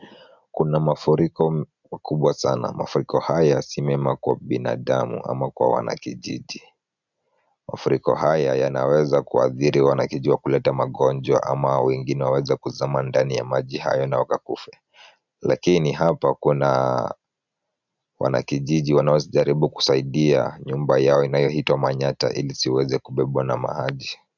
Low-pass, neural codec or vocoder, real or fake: 7.2 kHz; none; real